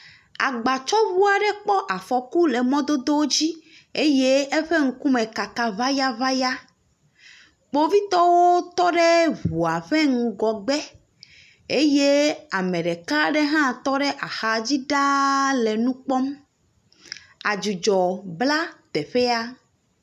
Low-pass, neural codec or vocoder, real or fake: 14.4 kHz; none; real